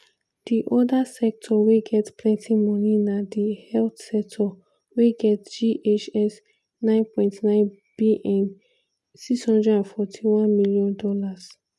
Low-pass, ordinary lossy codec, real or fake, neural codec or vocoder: none; none; real; none